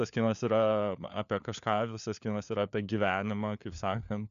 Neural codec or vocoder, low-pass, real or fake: codec, 16 kHz, 4 kbps, FunCodec, trained on LibriTTS, 50 frames a second; 7.2 kHz; fake